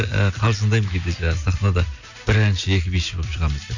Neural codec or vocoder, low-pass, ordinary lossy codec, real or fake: none; 7.2 kHz; none; real